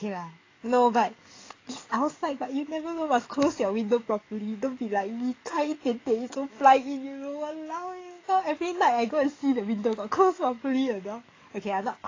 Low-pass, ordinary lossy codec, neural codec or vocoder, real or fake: 7.2 kHz; AAC, 32 kbps; codec, 44.1 kHz, 7.8 kbps, DAC; fake